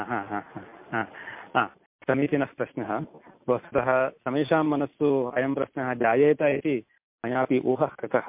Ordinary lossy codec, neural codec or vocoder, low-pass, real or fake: MP3, 32 kbps; none; 3.6 kHz; real